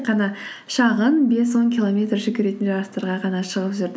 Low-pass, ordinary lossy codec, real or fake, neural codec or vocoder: none; none; real; none